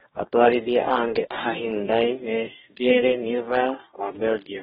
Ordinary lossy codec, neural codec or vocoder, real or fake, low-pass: AAC, 16 kbps; codec, 44.1 kHz, 2.6 kbps, DAC; fake; 19.8 kHz